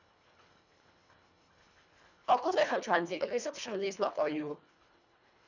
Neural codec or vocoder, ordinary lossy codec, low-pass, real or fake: codec, 24 kHz, 1.5 kbps, HILCodec; none; 7.2 kHz; fake